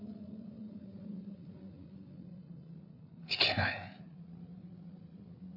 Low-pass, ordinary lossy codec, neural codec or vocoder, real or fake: 5.4 kHz; none; codec, 16 kHz, 8 kbps, FreqCodec, larger model; fake